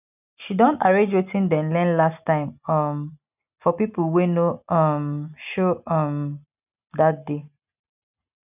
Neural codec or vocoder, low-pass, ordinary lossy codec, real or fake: none; 3.6 kHz; none; real